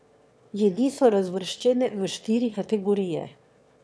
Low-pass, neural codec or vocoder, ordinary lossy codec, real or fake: none; autoencoder, 22.05 kHz, a latent of 192 numbers a frame, VITS, trained on one speaker; none; fake